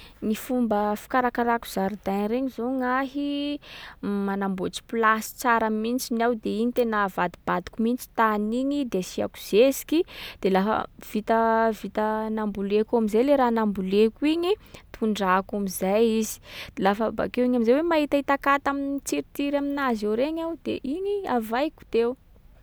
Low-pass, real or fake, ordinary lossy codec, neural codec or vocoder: none; real; none; none